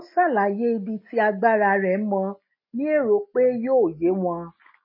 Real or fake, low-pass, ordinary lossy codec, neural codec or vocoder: real; 5.4 kHz; MP3, 24 kbps; none